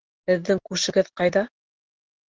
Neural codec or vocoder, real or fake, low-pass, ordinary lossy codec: none; real; 7.2 kHz; Opus, 16 kbps